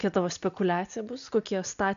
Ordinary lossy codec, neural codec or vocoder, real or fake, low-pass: MP3, 96 kbps; none; real; 7.2 kHz